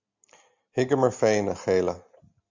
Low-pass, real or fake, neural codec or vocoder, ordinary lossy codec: 7.2 kHz; real; none; MP3, 64 kbps